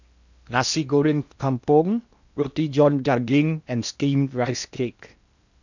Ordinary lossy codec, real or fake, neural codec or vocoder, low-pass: none; fake; codec, 16 kHz in and 24 kHz out, 0.8 kbps, FocalCodec, streaming, 65536 codes; 7.2 kHz